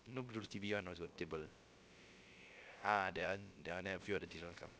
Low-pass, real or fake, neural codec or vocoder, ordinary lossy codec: none; fake; codec, 16 kHz, about 1 kbps, DyCAST, with the encoder's durations; none